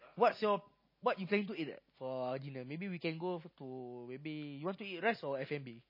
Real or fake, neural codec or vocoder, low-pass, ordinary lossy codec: real; none; 5.4 kHz; MP3, 24 kbps